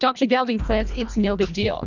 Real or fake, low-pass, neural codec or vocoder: fake; 7.2 kHz; codec, 24 kHz, 1.5 kbps, HILCodec